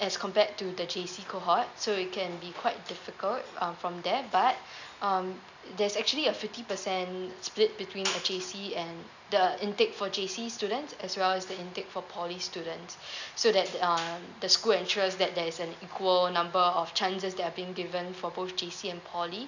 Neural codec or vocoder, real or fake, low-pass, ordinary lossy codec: none; real; 7.2 kHz; none